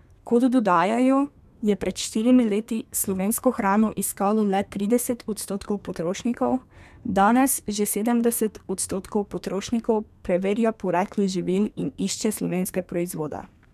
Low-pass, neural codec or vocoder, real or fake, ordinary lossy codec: 14.4 kHz; codec, 32 kHz, 1.9 kbps, SNAC; fake; none